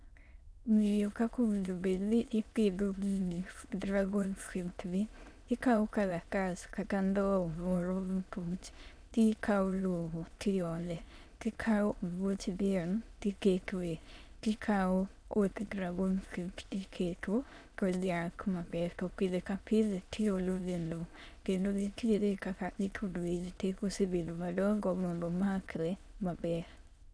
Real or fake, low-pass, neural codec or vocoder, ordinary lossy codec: fake; none; autoencoder, 22.05 kHz, a latent of 192 numbers a frame, VITS, trained on many speakers; none